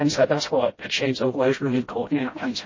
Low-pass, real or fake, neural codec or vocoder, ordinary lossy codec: 7.2 kHz; fake; codec, 16 kHz, 0.5 kbps, FreqCodec, smaller model; MP3, 32 kbps